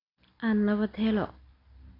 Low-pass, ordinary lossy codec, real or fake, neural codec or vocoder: 5.4 kHz; AAC, 24 kbps; real; none